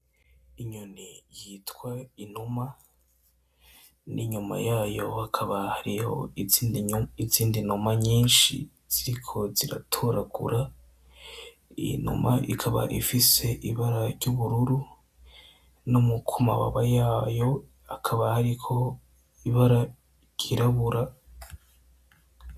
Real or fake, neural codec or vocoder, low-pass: real; none; 14.4 kHz